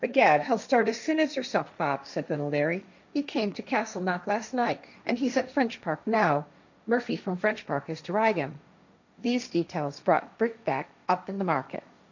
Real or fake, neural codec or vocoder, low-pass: fake; codec, 16 kHz, 1.1 kbps, Voila-Tokenizer; 7.2 kHz